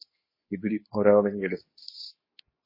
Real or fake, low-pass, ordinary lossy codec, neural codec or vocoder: fake; 5.4 kHz; MP3, 24 kbps; codec, 24 kHz, 0.9 kbps, WavTokenizer, medium speech release version 2